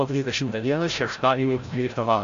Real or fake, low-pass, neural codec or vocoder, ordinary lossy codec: fake; 7.2 kHz; codec, 16 kHz, 0.5 kbps, FreqCodec, larger model; AAC, 64 kbps